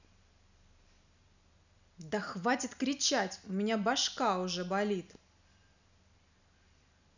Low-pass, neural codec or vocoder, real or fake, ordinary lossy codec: 7.2 kHz; none; real; none